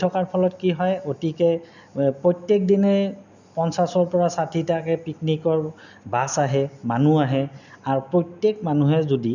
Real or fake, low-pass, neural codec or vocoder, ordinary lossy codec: real; 7.2 kHz; none; none